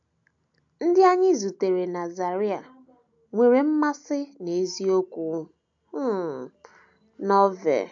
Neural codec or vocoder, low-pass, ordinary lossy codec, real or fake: none; 7.2 kHz; AAC, 64 kbps; real